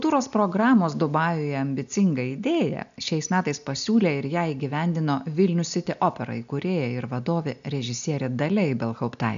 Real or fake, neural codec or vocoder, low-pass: real; none; 7.2 kHz